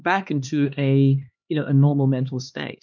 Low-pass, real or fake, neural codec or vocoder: 7.2 kHz; fake; codec, 16 kHz, 2 kbps, X-Codec, HuBERT features, trained on LibriSpeech